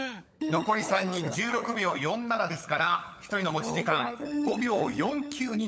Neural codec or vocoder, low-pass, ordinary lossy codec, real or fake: codec, 16 kHz, 16 kbps, FunCodec, trained on LibriTTS, 50 frames a second; none; none; fake